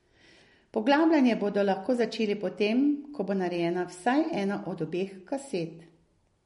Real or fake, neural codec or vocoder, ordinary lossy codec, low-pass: real; none; MP3, 48 kbps; 19.8 kHz